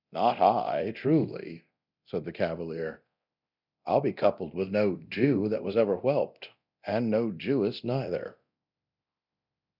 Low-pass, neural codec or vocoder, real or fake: 5.4 kHz; codec, 24 kHz, 0.9 kbps, DualCodec; fake